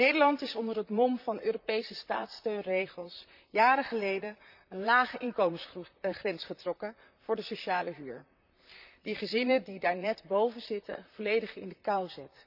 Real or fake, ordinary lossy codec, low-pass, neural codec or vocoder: fake; none; 5.4 kHz; vocoder, 44.1 kHz, 128 mel bands, Pupu-Vocoder